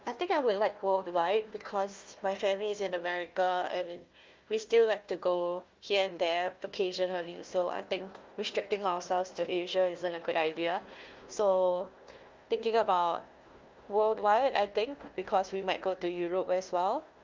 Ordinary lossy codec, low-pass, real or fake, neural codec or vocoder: Opus, 24 kbps; 7.2 kHz; fake; codec, 16 kHz, 1 kbps, FunCodec, trained on Chinese and English, 50 frames a second